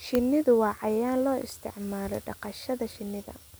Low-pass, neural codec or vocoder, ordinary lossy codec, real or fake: none; none; none; real